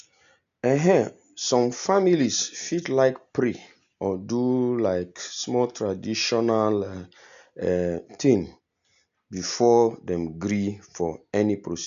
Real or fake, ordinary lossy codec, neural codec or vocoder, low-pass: real; none; none; 7.2 kHz